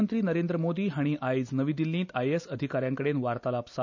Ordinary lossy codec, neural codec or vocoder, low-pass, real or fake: none; none; 7.2 kHz; real